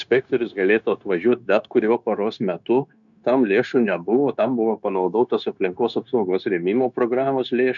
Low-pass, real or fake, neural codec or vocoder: 7.2 kHz; fake; codec, 16 kHz, 0.9 kbps, LongCat-Audio-Codec